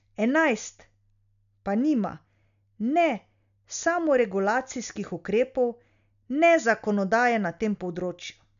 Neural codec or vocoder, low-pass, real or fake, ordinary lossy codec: none; 7.2 kHz; real; none